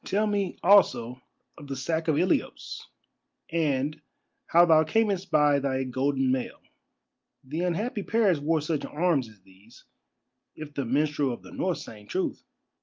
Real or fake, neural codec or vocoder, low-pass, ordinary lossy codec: real; none; 7.2 kHz; Opus, 24 kbps